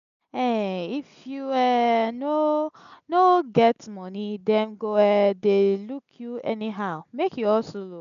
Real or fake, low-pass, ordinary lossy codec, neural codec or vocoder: real; 7.2 kHz; none; none